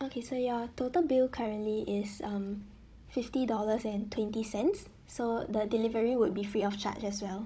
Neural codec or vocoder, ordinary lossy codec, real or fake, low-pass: codec, 16 kHz, 16 kbps, FunCodec, trained on Chinese and English, 50 frames a second; none; fake; none